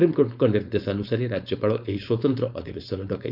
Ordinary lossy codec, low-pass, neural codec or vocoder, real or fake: none; 5.4 kHz; codec, 16 kHz, 4.8 kbps, FACodec; fake